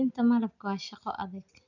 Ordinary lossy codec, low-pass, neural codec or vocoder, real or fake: none; 7.2 kHz; none; real